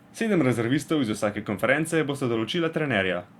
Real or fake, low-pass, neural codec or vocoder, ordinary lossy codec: fake; 19.8 kHz; vocoder, 44.1 kHz, 128 mel bands every 256 samples, BigVGAN v2; Opus, 64 kbps